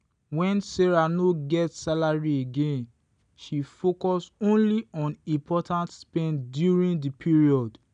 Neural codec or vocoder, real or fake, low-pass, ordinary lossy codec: none; real; 9.9 kHz; none